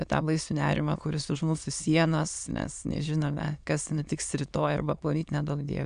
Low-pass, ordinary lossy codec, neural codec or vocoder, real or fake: 9.9 kHz; AAC, 96 kbps; autoencoder, 22.05 kHz, a latent of 192 numbers a frame, VITS, trained on many speakers; fake